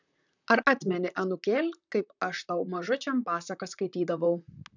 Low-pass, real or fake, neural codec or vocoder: 7.2 kHz; fake; vocoder, 44.1 kHz, 128 mel bands, Pupu-Vocoder